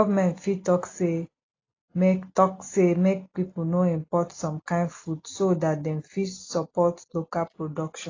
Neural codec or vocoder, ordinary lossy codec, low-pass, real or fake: none; AAC, 32 kbps; 7.2 kHz; real